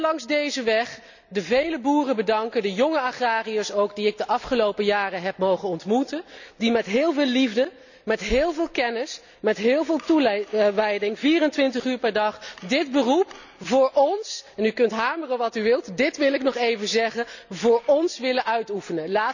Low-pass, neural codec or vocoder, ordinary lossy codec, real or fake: 7.2 kHz; none; none; real